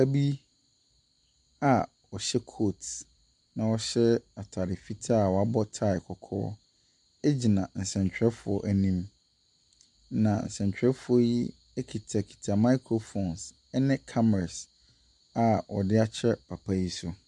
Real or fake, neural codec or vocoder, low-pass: real; none; 10.8 kHz